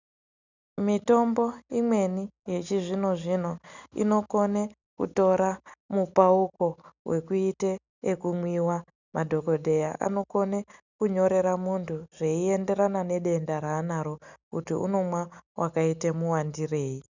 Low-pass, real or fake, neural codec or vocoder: 7.2 kHz; real; none